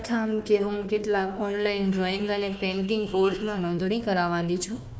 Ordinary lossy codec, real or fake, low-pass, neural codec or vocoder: none; fake; none; codec, 16 kHz, 1 kbps, FunCodec, trained on Chinese and English, 50 frames a second